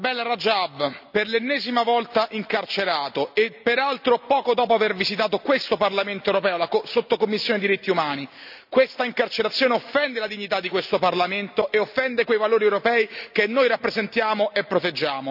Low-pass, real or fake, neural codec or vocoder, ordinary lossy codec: 5.4 kHz; real; none; none